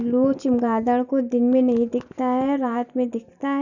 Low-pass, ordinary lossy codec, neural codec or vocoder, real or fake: 7.2 kHz; none; none; real